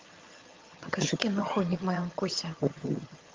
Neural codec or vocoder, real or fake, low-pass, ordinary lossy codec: vocoder, 22.05 kHz, 80 mel bands, HiFi-GAN; fake; 7.2 kHz; Opus, 32 kbps